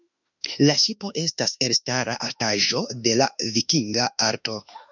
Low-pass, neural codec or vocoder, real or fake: 7.2 kHz; autoencoder, 48 kHz, 32 numbers a frame, DAC-VAE, trained on Japanese speech; fake